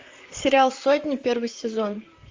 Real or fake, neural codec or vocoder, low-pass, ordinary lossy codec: fake; vocoder, 44.1 kHz, 128 mel bands, Pupu-Vocoder; 7.2 kHz; Opus, 32 kbps